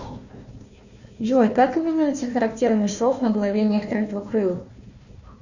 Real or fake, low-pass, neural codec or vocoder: fake; 7.2 kHz; codec, 16 kHz, 1 kbps, FunCodec, trained on Chinese and English, 50 frames a second